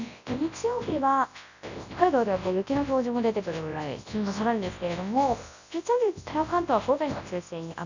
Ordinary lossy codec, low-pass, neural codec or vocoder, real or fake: none; 7.2 kHz; codec, 24 kHz, 0.9 kbps, WavTokenizer, large speech release; fake